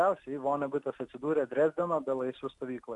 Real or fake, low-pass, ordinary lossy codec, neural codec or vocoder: real; 10.8 kHz; AAC, 64 kbps; none